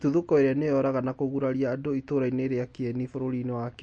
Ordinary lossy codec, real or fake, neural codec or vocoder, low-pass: MP3, 48 kbps; real; none; 9.9 kHz